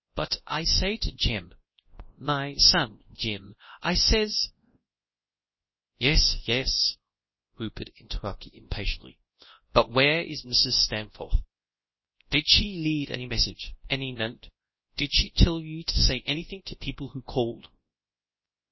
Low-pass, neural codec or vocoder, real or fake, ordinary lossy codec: 7.2 kHz; codec, 24 kHz, 0.9 kbps, WavTokenizer, large speech release; fake; MP3, 24 kbps